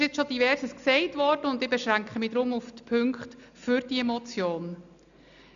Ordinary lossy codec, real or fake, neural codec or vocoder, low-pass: none; real; none; 7.2 kHz